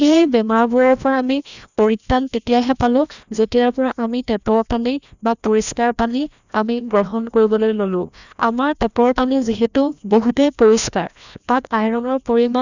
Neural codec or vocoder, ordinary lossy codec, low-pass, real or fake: codec, 16 kHz, 1 kbps, FreqCodec, larger model; none; 7.2 kHz; fake